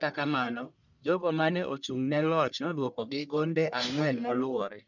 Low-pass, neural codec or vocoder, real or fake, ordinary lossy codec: 7.2 kHz; codec, 44.1 kHz, 1.7 kbps, Pupu-Codec; fake; none